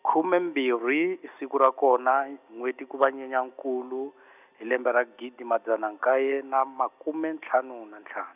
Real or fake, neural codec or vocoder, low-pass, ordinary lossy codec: real; none; 3.6 kHz; none